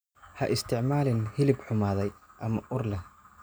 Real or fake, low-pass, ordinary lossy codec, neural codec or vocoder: real; none; none; none